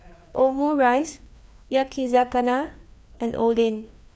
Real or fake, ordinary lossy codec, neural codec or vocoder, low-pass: fake; none; codec, 16 kHz, 2 kbps, FreqCodec, larger model; none